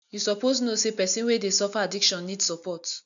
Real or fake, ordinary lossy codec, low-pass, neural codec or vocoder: real; none; 7.2 kHz; none